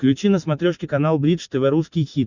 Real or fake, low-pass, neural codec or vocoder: real; 7.2 kHz; none